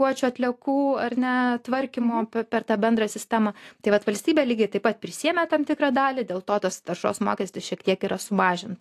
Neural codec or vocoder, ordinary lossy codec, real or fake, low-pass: none; AAC, 64 kbps; real; 14.4 kHz